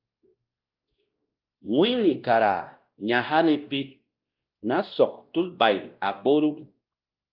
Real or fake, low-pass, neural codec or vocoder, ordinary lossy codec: fake; 5.4 kHz; codec, 16 kHz, 1 kbps, X-Codec, WavLM features, trained on Multilingual LibriSpeech; Opus, 32 kbps